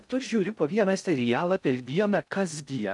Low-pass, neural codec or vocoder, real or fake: 10.8 kHz; codec, 16 kHz in and 24 kHz out, 0.6 kbps, FocalCodec, streaming, 2048 codes; fake